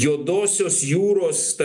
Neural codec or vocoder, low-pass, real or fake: none; 10.8 kHz; real